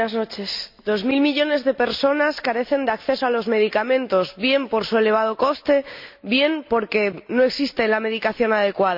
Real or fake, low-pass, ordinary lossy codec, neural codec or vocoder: real; 5.4 kHz; MP3, 48 kbps; none